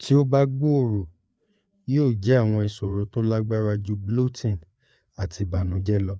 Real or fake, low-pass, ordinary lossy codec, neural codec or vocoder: fake; none; none; codec, 16 kHz, 4 kbps, FreqCodec, larger model